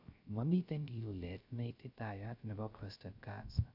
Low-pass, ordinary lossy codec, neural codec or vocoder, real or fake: 5.4 kHz; none; codec, 16 kHz, 0.3 kbps, FocalCodec; fake